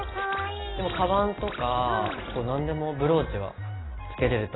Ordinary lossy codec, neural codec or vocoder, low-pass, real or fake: AAC, 16 kbps; none; 7.2 kHz; real